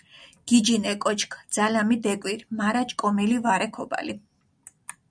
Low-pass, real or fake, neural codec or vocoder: 9.9 kHz; real; none